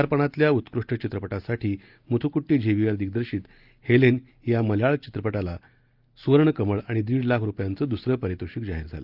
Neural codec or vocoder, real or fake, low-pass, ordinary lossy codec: none; real; 5.4 kHz; Opus, 32 kbps